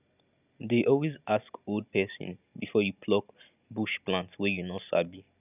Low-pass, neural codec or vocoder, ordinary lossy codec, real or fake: 3.6 kHz; none; none; real